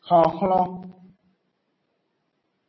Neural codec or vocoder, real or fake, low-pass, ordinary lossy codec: none; real; 7.2 kHz; MP3, 24 kbps